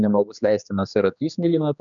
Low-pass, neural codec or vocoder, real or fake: 7.2 kHz; codec, 16 kHz, 2 kbps, X-Codec, HuBERT features, trained on general audio; fake